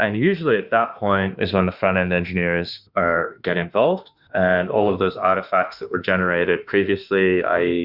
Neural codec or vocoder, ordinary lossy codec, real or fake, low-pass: autoencoder, 48 kHz, 32 numbers a frame, DAC-VAE, trained on Japanese speech; Opus, 64 kbps; fake; 5.4 kHz